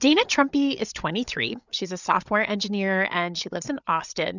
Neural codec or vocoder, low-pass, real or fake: codec, 16 kHz, 8 kbps, FreqCodec, larger model; 7.2 kHz; fake